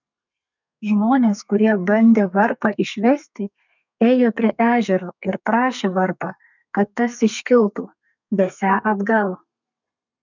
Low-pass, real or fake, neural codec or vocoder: 7.2 kHz; fake; codec, 32 kHz, 1.9 kbps, SNAC